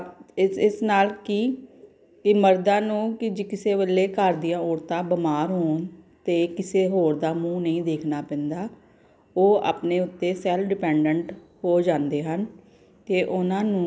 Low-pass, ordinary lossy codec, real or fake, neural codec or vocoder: none; none; real; none